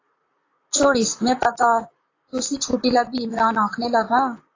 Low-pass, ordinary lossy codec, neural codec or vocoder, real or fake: 7.2 kHz; AAC, 32 kbps; vocoder, 44.1 kHz, 128 mel bands, Pupu-Vocoder; fake